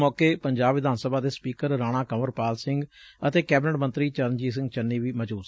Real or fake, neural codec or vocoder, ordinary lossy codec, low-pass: real; none; none; none